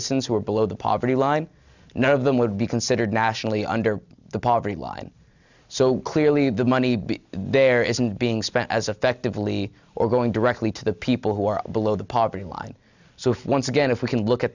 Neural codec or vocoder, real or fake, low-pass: none; real; 7.2 kHz